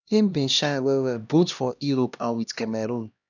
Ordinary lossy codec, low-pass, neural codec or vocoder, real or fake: none; 7.2 kHz; codec, 16 kHz, 1 kbps, X-Codec, HuBERT features, trained on LibriSpeech; fake